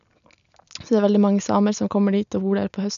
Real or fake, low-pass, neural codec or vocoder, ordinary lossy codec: real; 7.2 kHz; none; none